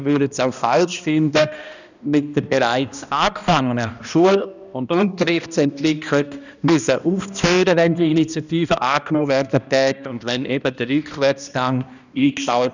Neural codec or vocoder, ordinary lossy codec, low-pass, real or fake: codec, 16 kHz, 1 kbps, X-Codec, HuBERT features, trained on balanced general audio; none; 7.2 kHz; fake